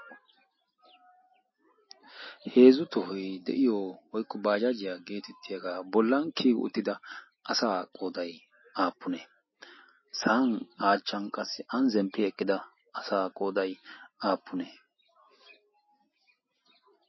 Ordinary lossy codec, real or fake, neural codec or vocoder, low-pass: MP3, 24 kbps; real; none; 7.2 kHz